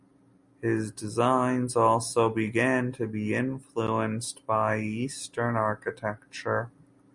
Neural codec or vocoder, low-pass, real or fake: none; 10.8 kHz; real